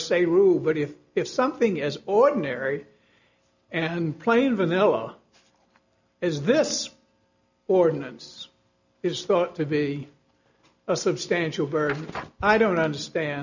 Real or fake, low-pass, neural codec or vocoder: real; 7.2 kHz; none